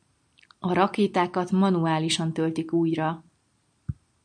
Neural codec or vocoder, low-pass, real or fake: none; 9.9 kHz; real